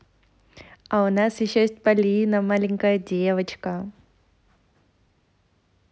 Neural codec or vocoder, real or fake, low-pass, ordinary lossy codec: none; real; none; none